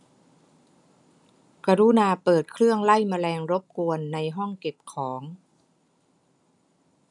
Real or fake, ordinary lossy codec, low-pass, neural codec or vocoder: real; none; 10.8 kHz; none